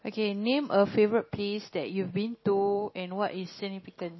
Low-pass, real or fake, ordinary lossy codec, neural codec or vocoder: 7.2 kHz; real; MP3, 24 kbps; none